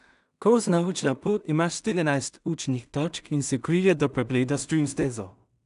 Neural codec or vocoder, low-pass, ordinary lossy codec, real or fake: codec, 16 kHz in and 24 kHz out, 0.4 kbps, LongCat-Audio-Codec, two codebook decoder; 10.8 kHz; none; fake